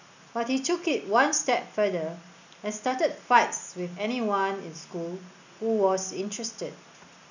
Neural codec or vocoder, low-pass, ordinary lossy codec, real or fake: none; 7.2 kHz; none; real